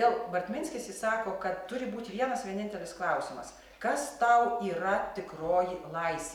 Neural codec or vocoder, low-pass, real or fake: none; 19.8 kHz; real